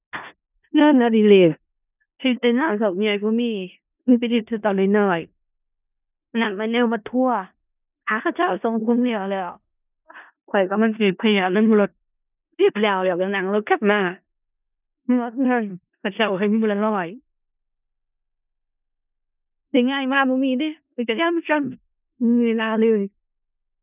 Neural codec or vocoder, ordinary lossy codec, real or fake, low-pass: codec, 16 kHz in and 24 kHz out, 0.4 kbps, LongCat-Audio-Codec, four codebook decoder; none; fake; 3.6 kHz